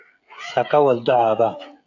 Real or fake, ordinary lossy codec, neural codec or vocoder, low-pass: fake; AAC, 48 kbps; codec, 16 kHz, 16 kbps, FreqCodec, smaller model; 7.2 kHz